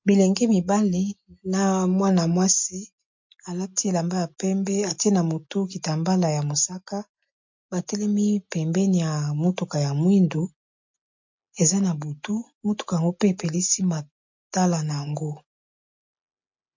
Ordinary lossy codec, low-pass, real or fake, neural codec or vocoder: MP3, 48 kbps; 7.2 kHz; real; none